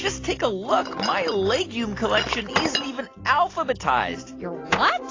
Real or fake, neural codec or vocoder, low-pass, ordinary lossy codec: real; none; 7.2 kHz; AAC, 32 kbps